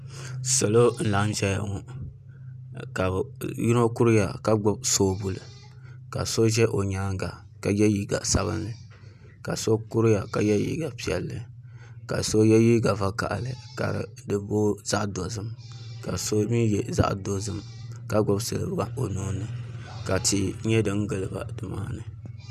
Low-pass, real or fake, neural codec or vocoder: 14.4 kHz; real; none